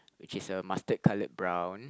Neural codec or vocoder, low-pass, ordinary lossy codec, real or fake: none; none; none; real